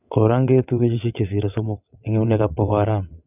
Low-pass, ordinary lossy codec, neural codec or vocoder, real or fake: 3.6 kHz; none; vocoder, 22.05 kHz, 80 mel bands, WaveNeXt; fake